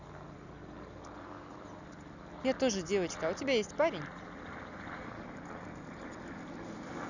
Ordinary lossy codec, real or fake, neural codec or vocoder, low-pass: none; fake; vocoder, 44.1 kHz, 128 mel bands every 256 samples, BigVGAN v2; 7.2 kHz